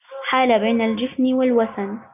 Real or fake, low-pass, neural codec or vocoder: real; 3.6 kHz; none